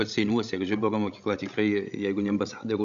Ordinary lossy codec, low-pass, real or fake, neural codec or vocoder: MP3, 64 kbps; 7.2 kHz; fake; codec, 16 kHz, 16 kbps, FreqCodec, larger model